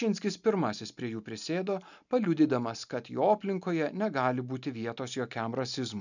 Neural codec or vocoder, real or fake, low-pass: none; real; 7.2 kHz